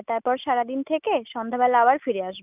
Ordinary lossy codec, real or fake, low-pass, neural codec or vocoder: none; real; 3.6 kHz; none